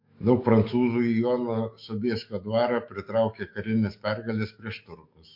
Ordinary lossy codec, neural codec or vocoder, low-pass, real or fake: MP3, 32 kbps; autoencoder, 48 kHz, 128 numbers a frame, DAC-VAE, trained on Japanese speech; 5.4 kHz; fake